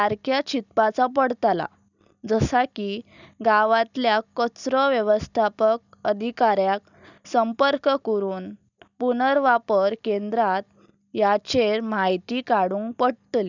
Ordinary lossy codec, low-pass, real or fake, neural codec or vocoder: none; 7.2 kHz; real; none